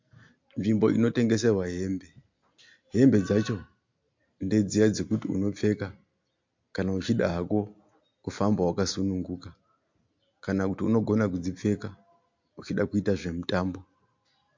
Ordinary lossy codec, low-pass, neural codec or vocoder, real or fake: MP3, 48 kbps; 7.2 kHz; none; real